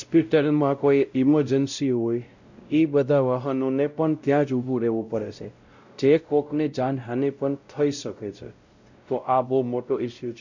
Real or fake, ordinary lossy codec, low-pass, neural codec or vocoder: fake; none; 7.2 kHz; codec, 16 kHz, 0.5 kbps, X-Codec, WavLM features, trained on Multilingual LibriSpeech